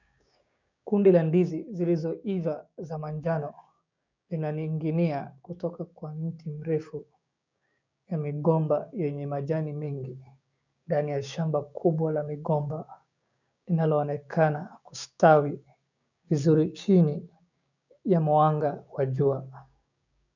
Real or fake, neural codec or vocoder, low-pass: fake; codec, 16 kHz, 6 kbps, DAC; 7.2 kHz